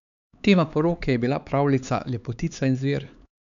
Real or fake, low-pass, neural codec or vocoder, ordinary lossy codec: fake; 7.2 kHz; codec, 16 kHz, 4 kbps, X-Codec, HuBERT features, trained on LibriSpeech; none